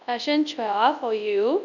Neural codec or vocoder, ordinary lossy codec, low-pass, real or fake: codec, 24 kHz, 0.9 kbps, WavTokenizer, large speech release; none; 7.2 kHz; fake